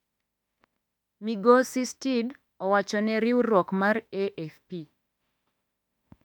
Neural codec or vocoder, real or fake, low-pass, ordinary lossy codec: autoencoder, 48 kHz, 32 numbers a frame, DAC-VAE, trained on Japanese speech; fake; 19.8 kHz; MP3, 96 kbps